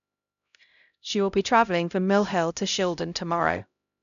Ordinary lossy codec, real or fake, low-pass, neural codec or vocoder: none; fake; 7.2 kHz; codec, 16 kHz, 0.5 kbps, X-Codec, HuBERT features, trained on LibriSpeech